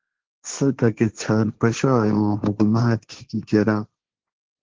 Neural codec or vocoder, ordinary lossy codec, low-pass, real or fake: codec, 16 kHz, 1.1 kbps, Voila-Tokenizer; Opus, 16 kbps; 7.2 kHz; fake